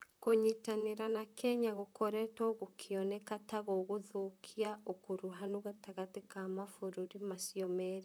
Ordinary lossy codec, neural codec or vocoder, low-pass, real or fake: none; vocoder, 44.1 kHz, 128 mel bands, Pupu-Vocoder; none; fake